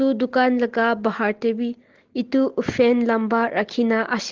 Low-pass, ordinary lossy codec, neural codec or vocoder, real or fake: 7.2 kHz; Opus, 16 kbps; none; real